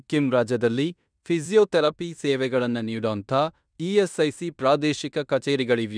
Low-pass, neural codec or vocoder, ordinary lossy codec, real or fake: 9.9 kHz; codec, 16 kHz in and 24 kHz out, 0.9 kbps, LongCat-Audio-Codec, fine tuned four codebook decoder; none; fake